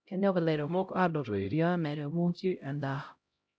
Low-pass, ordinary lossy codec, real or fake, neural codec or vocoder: none; none; fake; codec, 16 kHz, 0.5 kbps, X-Codec, HuBERT features, trained on LibriSpeech